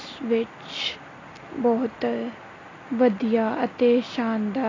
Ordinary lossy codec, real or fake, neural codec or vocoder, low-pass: AAC, 32 kbps; real; none; 7.2 kHz